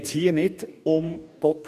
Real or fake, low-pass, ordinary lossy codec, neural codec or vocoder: fake; 14.4 kHz; none; codec, 44.1 kHz, 2.6 kbps, DAC